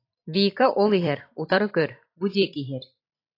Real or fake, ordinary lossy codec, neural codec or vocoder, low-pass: fake; AAC, 32 kbps; vocoder, 44.1 kHz, 128 mel bands every 256 samples, BigVGAN v2; 5.4 kHz